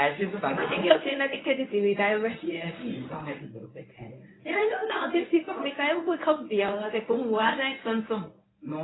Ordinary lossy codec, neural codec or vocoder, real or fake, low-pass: AAC, 16 kbps; codec, 24 kHz, 0.9 kbps, WavTokenizer, medium speech release version 1; fake; 7.2 kHz